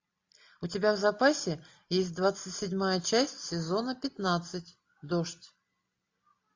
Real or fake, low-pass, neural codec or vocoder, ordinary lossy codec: real; 7.2 kHz; none; AAC, 48 kbps